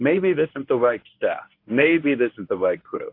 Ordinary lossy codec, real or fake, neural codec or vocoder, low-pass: AAC, 32 kbps; fake; codec, 24 kHz, 0.9 kbps, WavTokenizer, medium speech release version 1; 5.4 kHz